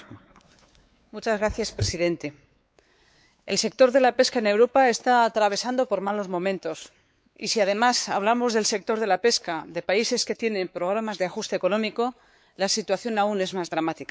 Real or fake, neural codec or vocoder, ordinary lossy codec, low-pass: fake; codec, 16 kHz, 4 kbps, X-Codec, WavLM features, trained on Multilingual LibriSpeech; none; none